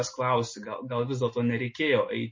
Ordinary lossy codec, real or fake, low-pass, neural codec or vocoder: MP3, 32 kbps; real; 7.2 kHz; none